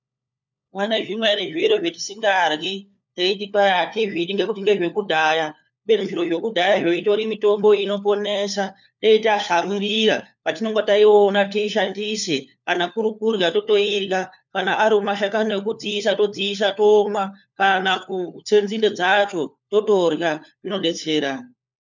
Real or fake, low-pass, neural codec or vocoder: fake; 7.2 kHz; codec, 16 kHz, 4 kbps, FunCodec, trained on LibriTTS, 50 frames a second